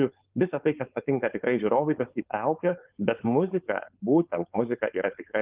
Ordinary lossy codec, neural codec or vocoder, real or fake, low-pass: Opus, 32 kbps; codec, 16 kHz, 4.8 kbps, FACodec; fake; 3.6 kHz